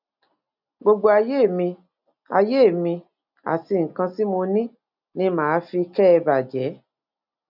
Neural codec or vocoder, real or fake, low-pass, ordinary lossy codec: none; real; 5.4 kHz; none